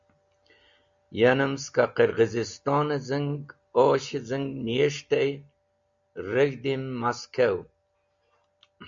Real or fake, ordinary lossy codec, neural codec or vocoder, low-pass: real; MP3, 64 kbps; none; 7.2 kHz